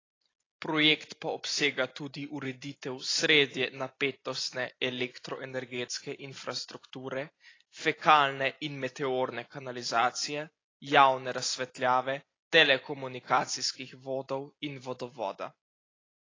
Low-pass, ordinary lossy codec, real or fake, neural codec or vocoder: 7.2 kHz; AAC, 32 kbps; real; none